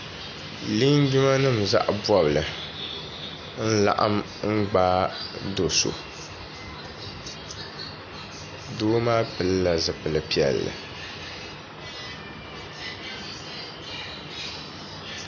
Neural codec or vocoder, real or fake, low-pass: none; real; 7.2 kHz